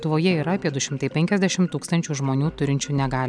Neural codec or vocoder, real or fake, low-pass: none; real; 9.9 kHz